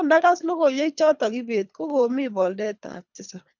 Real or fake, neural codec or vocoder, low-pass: fake; codec, 24 kHz, 3 kbps, HILCodec; 7.2 kHz